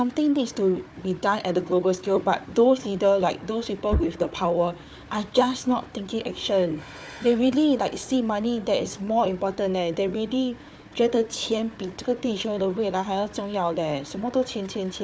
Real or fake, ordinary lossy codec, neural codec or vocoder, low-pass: fake; none; codec, 16 kHz, 4 kbps, FunCodec, trained on Chinese and English, 50 frames a second; none